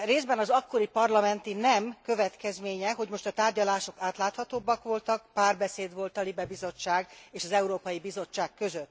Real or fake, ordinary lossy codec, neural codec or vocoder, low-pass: real; none; none; none